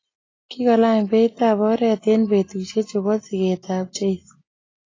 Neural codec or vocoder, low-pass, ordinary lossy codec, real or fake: none; 7.2 kHz; AAC, 32 kbps; real